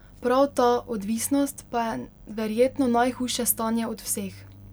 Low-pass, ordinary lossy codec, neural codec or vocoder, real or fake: none; none; none; real